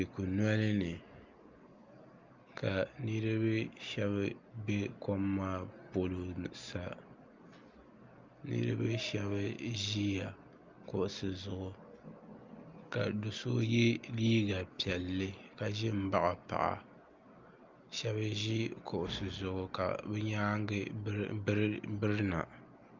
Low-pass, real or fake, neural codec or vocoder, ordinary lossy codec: 7.2 kHz; real; none; Opus, 24 kbps